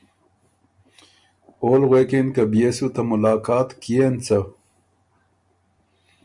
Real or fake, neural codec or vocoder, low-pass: real; none; 10.8 kHz